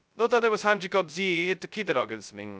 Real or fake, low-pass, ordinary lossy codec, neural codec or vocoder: fake; none; none; codec, 16 kHz, 0.2 kbps, FocalCodec